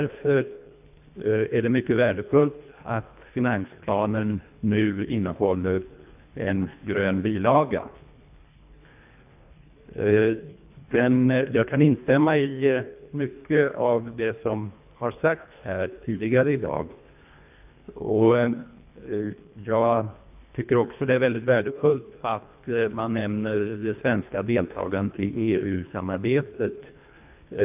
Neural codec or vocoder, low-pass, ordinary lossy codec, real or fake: codec, 24 kHz, 1.5 kbps, HILCodec; 3.6 kHz; AAC, 32 kbps; fake